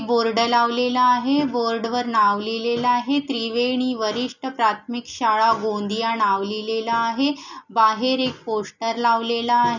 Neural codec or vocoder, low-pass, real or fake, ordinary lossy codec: none; 7.2 kHz; real; none